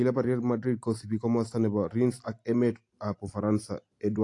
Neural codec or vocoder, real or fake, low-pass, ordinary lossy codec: none; real; 10.8 kHz; AAC, 48 kbps